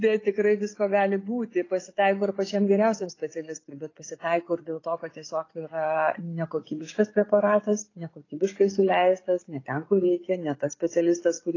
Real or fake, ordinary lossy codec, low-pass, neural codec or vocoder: real; AAC, 32 kbps; 7.2 kHz; none